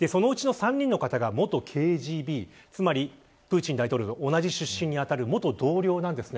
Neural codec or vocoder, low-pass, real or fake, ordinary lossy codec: none; none; real; none